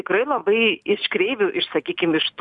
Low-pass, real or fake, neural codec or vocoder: 10.8 kHz; real; none